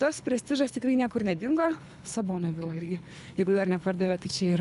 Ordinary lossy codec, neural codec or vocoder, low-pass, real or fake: AAC, 96 kbps; codec, 24 kHz, 3 kbps, HILCodec; 10.8 kHz; fake